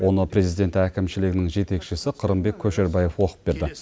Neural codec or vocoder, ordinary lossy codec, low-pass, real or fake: none; none; none; real